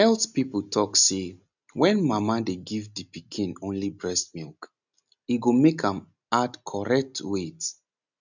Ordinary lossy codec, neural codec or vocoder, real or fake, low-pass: none; none; real; 7.2 kHz